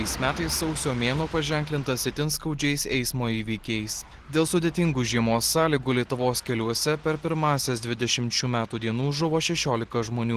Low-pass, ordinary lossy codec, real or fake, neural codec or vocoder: 14.4 kHz; Opus, 16 kbps; real; none